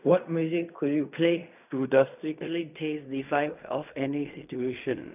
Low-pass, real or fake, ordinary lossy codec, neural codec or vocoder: 3.6 kHz; fake; none; codec, 16 kHz in and 24 kHz out, 0.4 kbps, LongCat-Audio-Codec, fine tuned four codebook decoder